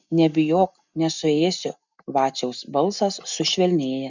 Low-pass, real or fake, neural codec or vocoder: 7.2 kHz; real; none